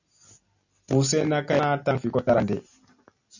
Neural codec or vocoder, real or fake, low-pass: none; real; 7.2 kHz